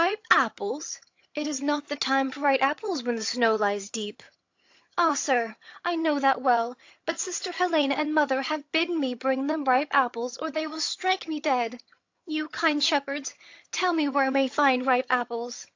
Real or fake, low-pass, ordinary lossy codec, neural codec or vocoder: fake; 7.2 kHz; AAC, 48 kbps; vocoder, 22.05 kHz, 80 mel bands, HiFi-GAN